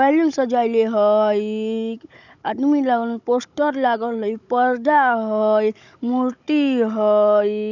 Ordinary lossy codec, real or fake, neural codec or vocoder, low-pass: none; fake; codec, 16 kHz, 16 kbps, FunCodec, trained on Chinese and English, 50 frames a second; 7.2 kHz